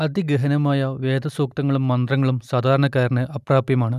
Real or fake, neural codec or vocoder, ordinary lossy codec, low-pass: real; none; none; 14.4 kHz